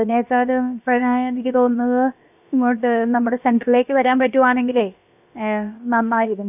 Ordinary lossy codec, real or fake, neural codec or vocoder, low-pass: none; fake; codec, 16 kHz, about 1 kbps, DyCAST, with the encoder's durations; 3.6 kHz